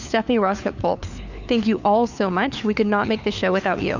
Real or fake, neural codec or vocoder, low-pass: fake; codec, 16 kHz, 4 kbps, FunCodec, trained on LibriTTS, 50 frames a second; 7.2 kHz